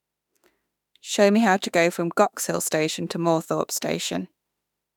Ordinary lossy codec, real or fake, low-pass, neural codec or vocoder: none; fake; 19.8 kHz; autoencoder, 48 kHz, 32 numbers a frame, DAC-VAE, trained on Japanese speech